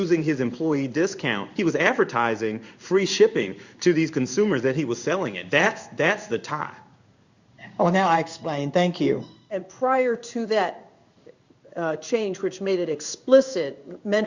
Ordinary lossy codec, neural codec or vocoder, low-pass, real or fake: Opus, 64 kbps; codec, 16 kHz in and 24 kHz out, 1 kbps, XY-Tokenizer; 7.2 kHz; fake